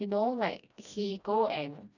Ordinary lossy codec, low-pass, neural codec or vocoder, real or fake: none; 7.2 kHz; codec, 16 kHz, 1 kbps, FreqCodec, smaller model; fake